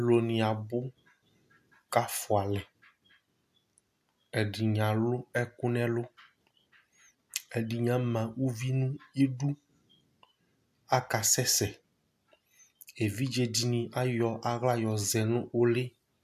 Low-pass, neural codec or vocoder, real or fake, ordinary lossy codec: 14.4 kHz; none; real; AAC, 96 kbps